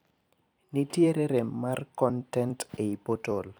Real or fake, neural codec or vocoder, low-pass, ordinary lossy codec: fake; vocoder, 44.1 kHz, 128 mel bands every 256 samples, BigVGAN v2; none; none